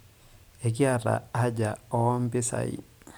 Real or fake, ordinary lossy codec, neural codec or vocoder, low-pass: fake; none; vocoder, 44.1 kHz, 128 mel bands every 512 samples, BigVGAN v2; none